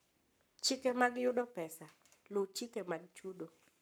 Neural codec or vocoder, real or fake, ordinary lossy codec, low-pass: codec, 44.1 kHz, 7.8 kbps, Pupu-Codec; fake; none; none